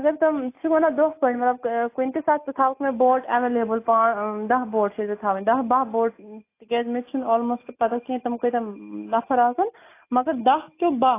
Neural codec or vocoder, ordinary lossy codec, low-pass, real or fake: none; AAC, 24 kbps; 3.6 kHz; real